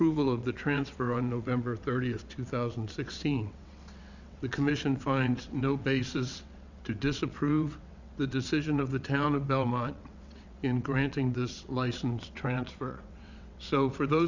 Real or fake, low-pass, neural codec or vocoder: fake; 7.2 kHz; vocoder, 22.05 kHz, 80 mel bands, WaveNeXt